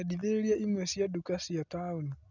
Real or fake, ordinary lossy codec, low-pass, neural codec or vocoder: real; none; 7.2 kHz; none